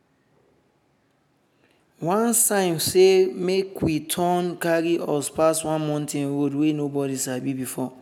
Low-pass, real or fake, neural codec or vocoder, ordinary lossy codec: none; real; none; none